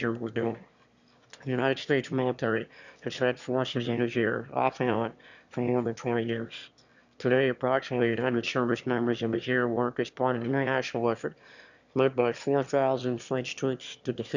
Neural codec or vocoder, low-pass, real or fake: autoencoder, 22.05 kHz, a latent of 192 numbers a frame, VITS, trained on one speaker; 7.2 kHz; fake